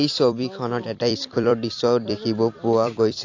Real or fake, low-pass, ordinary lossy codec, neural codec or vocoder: fake; 7.2 kHz; AAC, 48 kbps; vocoder, 44.1 kHz, 128 mel bands every 256 samples, BigVGAN v2